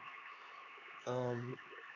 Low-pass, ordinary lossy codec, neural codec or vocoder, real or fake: 7.2 kHz; none; codec, 16 kHz, 4 kbps, X-Codec, HuBERT features, trained on LibriSpeech; fake